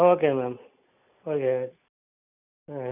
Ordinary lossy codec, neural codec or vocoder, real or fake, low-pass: none; none; real; 3.6 kHz